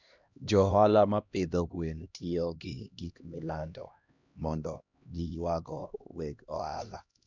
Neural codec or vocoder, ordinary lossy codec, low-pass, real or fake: codec, 16 kHz, 1 kbps, X-Codec, HuBERT features, trained on LibriSpeech; none; 7.2 kHz; fake